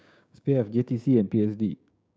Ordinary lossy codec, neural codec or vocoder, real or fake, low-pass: none; codec, 16 kHz, 6 kbps, DAC; fake; none